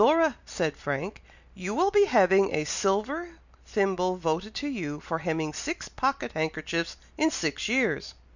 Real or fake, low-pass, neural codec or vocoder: real; 7.2 kHz; none